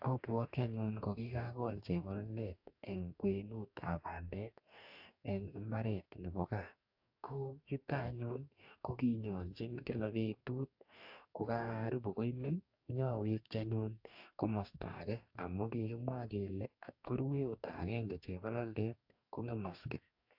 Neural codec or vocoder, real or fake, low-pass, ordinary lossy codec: codec, 44.1 kHz, 2.6 kbps, DAC; fake; 5.4 kHz; AAC, 48 kbps